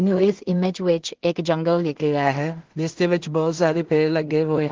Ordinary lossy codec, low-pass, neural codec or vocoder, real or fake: Opus, 16 kbps; 7.2 kHz; codec, 16 kHz in and 24 kHz out, 0.4 kbps, LongCat-Audio-Codec, two codebook decoder; fake